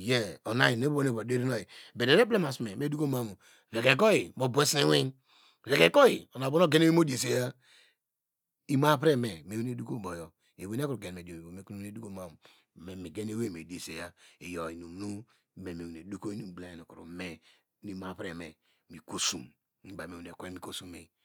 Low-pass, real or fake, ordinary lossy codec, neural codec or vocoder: none; real; none; none